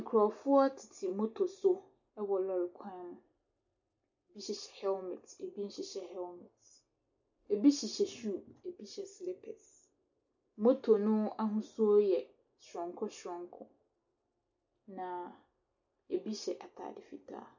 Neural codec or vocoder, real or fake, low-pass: none; real; 7.2 kHz